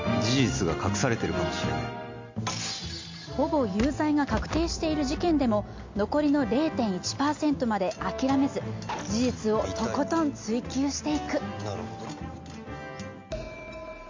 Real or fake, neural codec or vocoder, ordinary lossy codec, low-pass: real; none; MP3, 48 kbps; 7.2 kHz